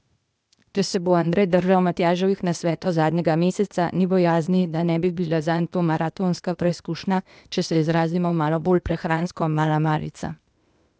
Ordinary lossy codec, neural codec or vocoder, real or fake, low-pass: none; codec, 16 kHz, 0.8 kbps, ZipCodec; fake; none